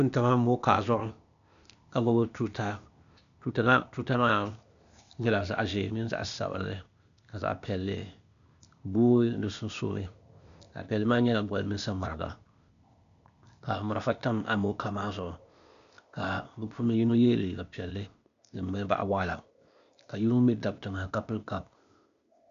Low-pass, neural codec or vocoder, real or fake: 7.2 kHz; codec, 16 kHz, 0.8 kbps, ZipCodec; fake